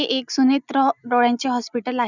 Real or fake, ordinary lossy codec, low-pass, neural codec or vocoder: real; none; 7.2 kHz; none